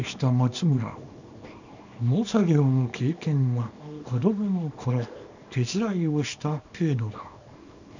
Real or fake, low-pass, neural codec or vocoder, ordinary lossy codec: fake; 7.2 kHz; codec, 24 kHz, 0.9 kbps, WavTokenizer, small release; none